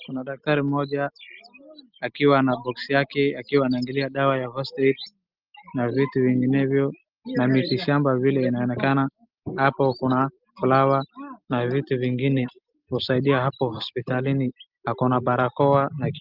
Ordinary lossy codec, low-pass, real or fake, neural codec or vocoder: Opus, 24 kbps; 5.4 kHz; real; none